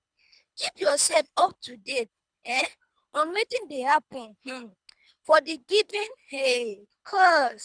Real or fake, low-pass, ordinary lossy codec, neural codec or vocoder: fake; 9.9 kHz; none; codec, 24 kHz, 3 kbps, HILCodec